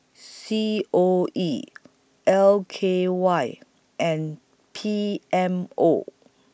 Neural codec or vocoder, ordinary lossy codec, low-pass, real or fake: none; none; none; real